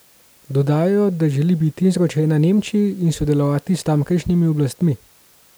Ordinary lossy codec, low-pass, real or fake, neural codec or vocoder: none; none; real; none